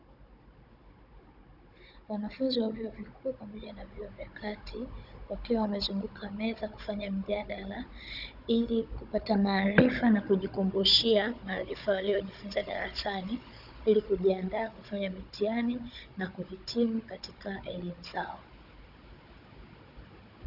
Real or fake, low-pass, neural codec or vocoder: fake; 5.4 kHz; codec, 16 kHz, 16 kbps, FunCodec, trained on Chinese and English, 50 frames a second